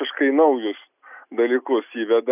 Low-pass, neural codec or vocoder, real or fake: 3.6 kHz; none; real